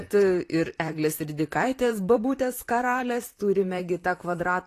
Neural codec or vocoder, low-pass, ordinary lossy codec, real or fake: vocoder, 44.1 kHz, 128 mel bands, Pupu-Vocoder; 14.4 kHz; AAC, 48 kbps; fake